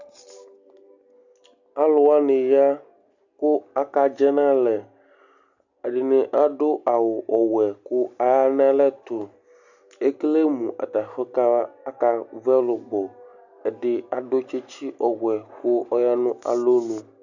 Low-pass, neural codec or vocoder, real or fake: 7.2 kHz; none; real